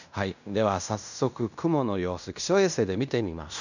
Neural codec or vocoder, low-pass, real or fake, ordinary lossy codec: codec, 16 kHz in and 24 kHz out, 0.9 kbps, LongCat-Audio-Codec, fine tuned four codebook decoder; 7.2 kHz; fake; none